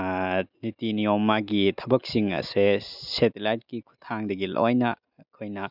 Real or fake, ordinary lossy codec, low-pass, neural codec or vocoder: real; none; 5.4 kHz; none